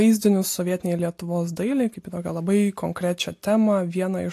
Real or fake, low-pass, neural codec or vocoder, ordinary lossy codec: real; 14.4 kHz; none; AAC, 64 kbps